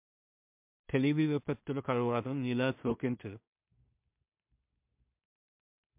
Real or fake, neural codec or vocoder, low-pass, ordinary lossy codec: fake; codec, 16 kHz in and 24 kHz out, 0.4 kbps, LongCat-Audio-Codec, two codebook decoder; 3.6 kHz; MP3, 32 kbps